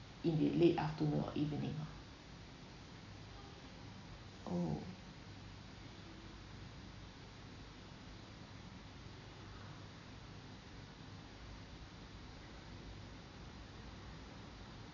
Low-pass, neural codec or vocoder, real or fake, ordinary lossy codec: 7.2 kHz; none; real; none